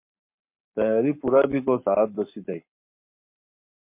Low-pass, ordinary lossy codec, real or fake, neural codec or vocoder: 3.6 kHz; MP3, 32 kbps; fake; codec, 44.1 kHz, 7.8 kbps, Pupu-Codec